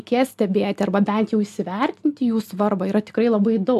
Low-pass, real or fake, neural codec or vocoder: 14.4 kHz; real; none